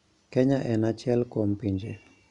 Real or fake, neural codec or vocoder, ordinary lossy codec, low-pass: real; none; none; 10.8 kHz